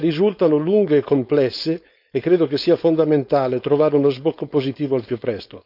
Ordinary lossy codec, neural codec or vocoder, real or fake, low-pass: none; codec, 16 kHz, 4.8 kbps, FACodec; fake; 5.4 kHz